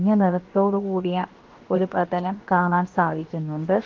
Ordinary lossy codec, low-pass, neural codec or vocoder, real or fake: Opus, 16 kbps; 7.2 kHz; codec, 16 kHz, 0.7 kbps, FocalCodec; fake